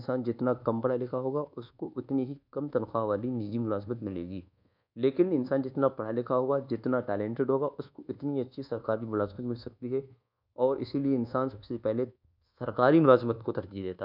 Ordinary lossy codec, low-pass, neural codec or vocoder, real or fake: none; 5.4 kHz; codec, 24 kHz, 1.2 kbps, DualCodec; fake